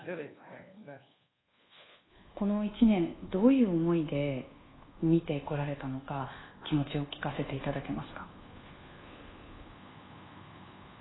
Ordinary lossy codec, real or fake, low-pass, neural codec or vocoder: AAC, 16 kbps; fake; 7.2 kHz; codec, 24 kHz, 1.2 kbps, DualCodec